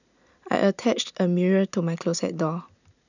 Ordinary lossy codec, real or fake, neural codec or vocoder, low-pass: none; real; none; 7.2 kHz